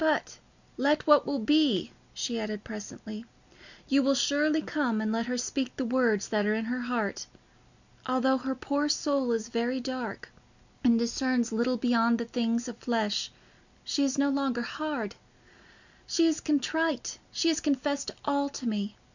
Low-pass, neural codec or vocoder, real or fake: 7.2 kHz; none; real